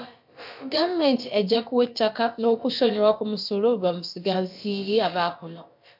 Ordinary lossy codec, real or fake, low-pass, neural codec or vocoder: MP3, 48 kbps; fake; 5.4 kHz; codec, 16 kHz, about 1 kbps, DyCAST, with the encoder's durations